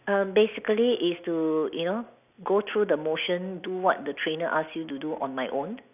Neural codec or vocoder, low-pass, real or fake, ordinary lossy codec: none; 3.6 kHz; real; none